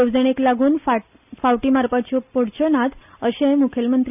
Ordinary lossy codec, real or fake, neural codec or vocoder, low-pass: none; real; none; 3.6 kHz